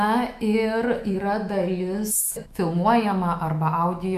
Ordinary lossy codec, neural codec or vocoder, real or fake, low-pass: MP3, 96 kbps; autoencoder, 48 kHz, 128 numbers a frame, DAC-VAE, trained on Japanese speech; fake; 14.4 kHz